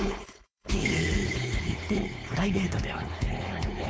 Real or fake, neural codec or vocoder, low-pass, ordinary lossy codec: fake; codec, 16 kHz, 4.8 kbps, FACodec; none; none